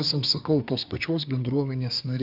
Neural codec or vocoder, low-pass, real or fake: codec, 24 kHz, 1 kbps, SNAC; 5.4 kHz; fake